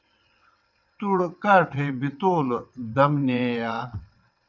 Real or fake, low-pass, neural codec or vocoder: fake; 7.2 kHz; vocoder, 22.05 kHz, 80 mel bands, WaveNeXt